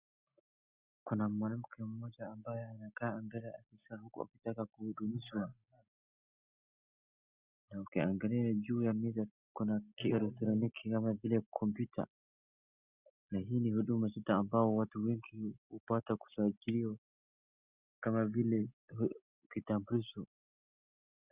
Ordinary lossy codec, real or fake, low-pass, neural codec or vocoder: Opus, 64 kbps; real; 3.6 kHz; none